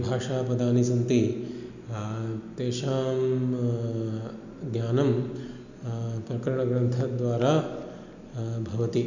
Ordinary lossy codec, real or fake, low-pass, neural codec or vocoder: none; real; 7.2 kHz; none